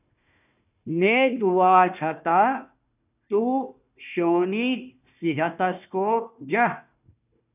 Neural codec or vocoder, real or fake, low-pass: codec, 16 kHz, 1 kbps, FunCodec, trained on Chinese and English, 50 frames a second; fake; 3.6 kHz